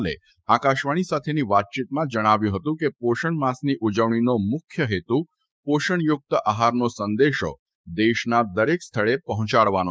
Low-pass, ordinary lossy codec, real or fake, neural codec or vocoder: none; none; fake; codec, 16 kHz, 6 kbps, DAC